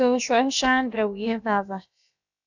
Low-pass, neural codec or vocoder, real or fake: 7.2 kHz; codec, 16 kHz, about 1 kbps, DyCAST, with the encoder's durations; fake